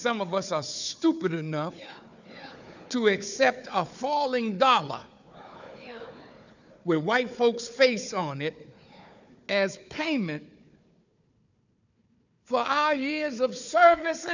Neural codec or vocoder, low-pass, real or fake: codec, 16 kHz, 4 kbps, FunCodec, trained on Chinese and English, 50 frames a second; 7.2 kHz; fake